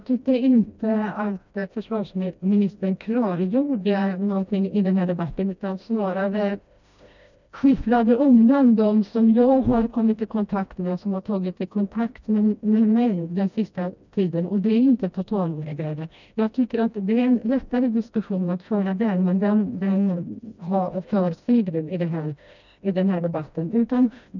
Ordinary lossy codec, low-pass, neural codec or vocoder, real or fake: none; 7.2 kHz; codec, 16 kHz, 1 kbps, FreqCodec, smaller model; fake